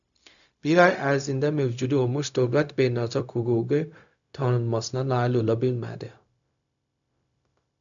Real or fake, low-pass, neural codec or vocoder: fake; 7.2 kHz; codec, 16 kHz, 0.4 kbps, LongCat-Audio-Codec